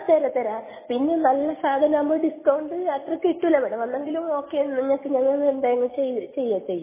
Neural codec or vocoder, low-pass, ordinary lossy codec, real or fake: none; 3.6 kHz; MP3, 16 kbps; real